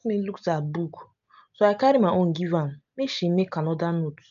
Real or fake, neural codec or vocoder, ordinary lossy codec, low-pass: real; none; none; 7.2 kHz